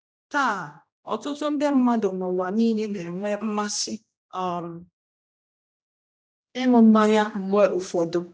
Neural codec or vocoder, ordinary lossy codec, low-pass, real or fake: codec, 16 kHz, 1 kbps, X-Codec, HuBERT features, trained on general audio; none; none; fake